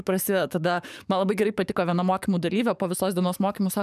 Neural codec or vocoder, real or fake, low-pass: codec, 44.1 kHz, 7.8 kbps, DAC; fake; 14.4 kHz